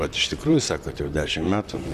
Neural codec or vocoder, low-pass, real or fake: vocoder, 44.1 kHz, 128 mel bands, Pupu-Vocoder; 14.4 kHz; fake